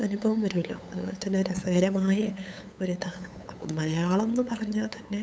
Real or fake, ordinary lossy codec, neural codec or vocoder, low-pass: fake; none; codec, 16 kHz, 8 kbps, FunCodec, trained on LibriTTS, 25 frames a second; none